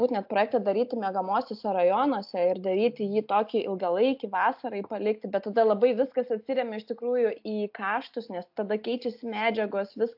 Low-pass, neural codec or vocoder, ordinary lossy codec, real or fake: 5.4 kHz; none; AAC, 48 kbps; real